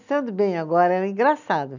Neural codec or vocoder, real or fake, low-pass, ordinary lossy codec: none; real; 7.2 kHz; none